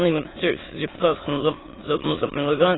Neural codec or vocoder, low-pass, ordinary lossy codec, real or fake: autoencoder, 22.05 kHz, a latent of 192 numbers a frame, VITS, trained on many speakers; 7.2 kHz; AAC, 16 kbps; fake